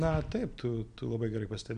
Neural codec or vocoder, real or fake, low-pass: none; real; 9.9 kHz